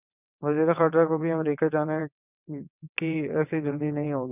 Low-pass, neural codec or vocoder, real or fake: 3.6 kHz; vocoder, 22.05 kHz, 80 mel bands, WaveNeXt; fake